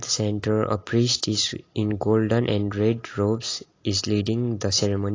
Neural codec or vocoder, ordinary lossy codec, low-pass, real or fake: none; AAC, 32 kbps; 7.2 kHz; real